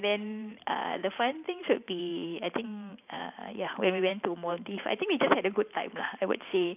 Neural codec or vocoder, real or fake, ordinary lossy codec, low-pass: vocoder, 44.1 kHz, 128 mel bands every 512 samples, BigVGAN v2; fake; none; 3.6 kHz